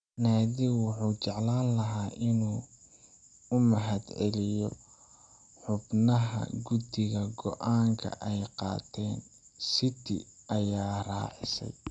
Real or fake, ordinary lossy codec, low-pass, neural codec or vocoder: real; none; 9.9 kHz; none